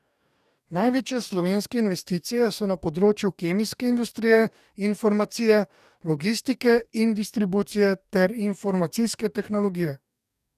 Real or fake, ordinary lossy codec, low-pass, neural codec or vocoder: fake; none; 14.4 kHz; codec, 44.1 kHz, 2.6 kbps, DAC